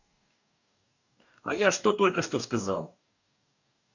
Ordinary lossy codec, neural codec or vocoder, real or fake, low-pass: none; codec, 44.1 kHz, 2.6 kbps, DAC; fake; 7.2 kHz